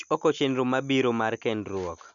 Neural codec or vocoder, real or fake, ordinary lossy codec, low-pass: none; real; none; 7.2 kHz